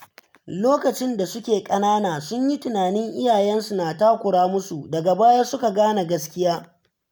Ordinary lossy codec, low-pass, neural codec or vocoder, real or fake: none; none; none; real